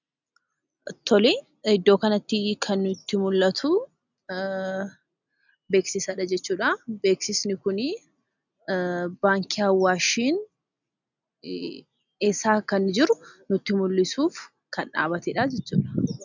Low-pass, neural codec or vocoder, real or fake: 7.2 kHz; none; real